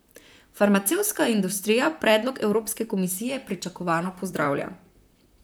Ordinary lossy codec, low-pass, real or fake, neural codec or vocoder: none; none; fake; vocoder, 44.1 kHz, 128 mel bands, Pupu-Vocoder